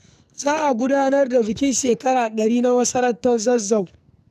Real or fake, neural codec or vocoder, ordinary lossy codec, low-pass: fake; codec, 44.1 kHz, 2.6 kbps, SNAC; none; 14.4 kHz